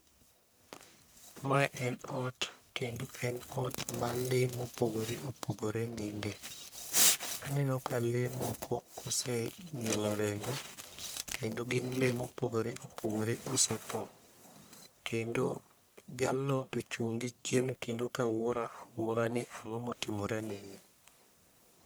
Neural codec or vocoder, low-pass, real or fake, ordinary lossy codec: codec, 44.1 kHz, 1.7 kbps, Pupu-Codec; none; fake; none